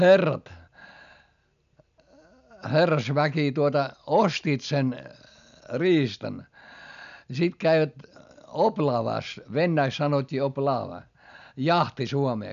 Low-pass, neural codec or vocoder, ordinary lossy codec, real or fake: 7.2 kHz; none; none; real